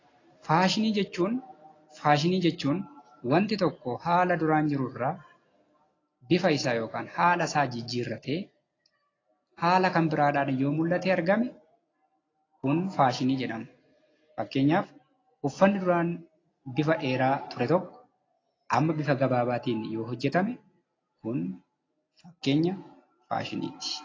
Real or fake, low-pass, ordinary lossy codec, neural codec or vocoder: real; 7.2 kHz; AAC, 32 kbps; none